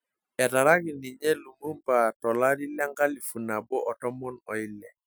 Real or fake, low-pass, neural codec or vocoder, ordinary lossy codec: real; none; none; none